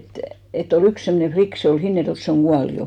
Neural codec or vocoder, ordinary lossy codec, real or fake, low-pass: none; none; real; 19.8 kHz